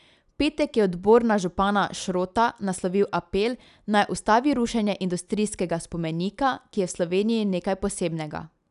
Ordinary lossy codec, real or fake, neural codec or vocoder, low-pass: none; real; none; 10.8 kHz